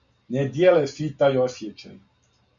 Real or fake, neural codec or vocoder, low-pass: real; none; 7.2 kHz